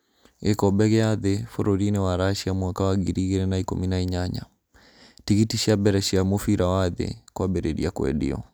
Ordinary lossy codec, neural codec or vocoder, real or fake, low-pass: none; none; real; none